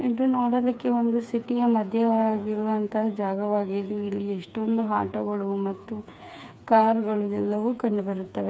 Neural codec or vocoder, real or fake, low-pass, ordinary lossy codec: codec, 16 kHz, 4 kbps, FreqCodec, smaller model; fake; none; none